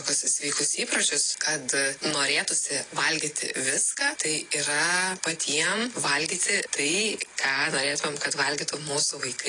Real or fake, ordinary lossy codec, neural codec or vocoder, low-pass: real; AAC, 32 kbps; none; 9.9 kHz